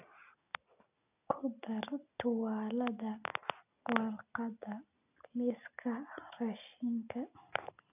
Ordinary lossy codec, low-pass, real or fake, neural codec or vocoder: none; 3.6 kHz; real; none